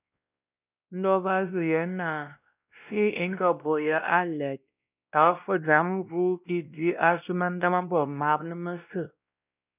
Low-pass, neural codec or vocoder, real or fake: 3.6 kHz; codec, 16 kHz, 1 kbps, X-Codec, WavLM features, trained on Multilingual LibriSpeech; fake